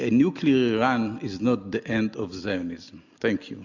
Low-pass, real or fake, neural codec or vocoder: 7.2 kHz; real; none